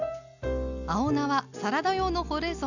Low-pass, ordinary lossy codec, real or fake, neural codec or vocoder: 7.2 kHz; none; real; none